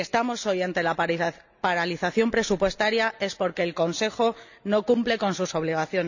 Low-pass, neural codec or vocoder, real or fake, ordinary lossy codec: 7.2 kHz; none; real; none